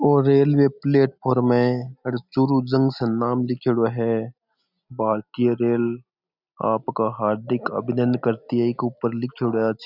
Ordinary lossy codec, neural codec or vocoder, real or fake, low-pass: none; none; real; 5.4 kHz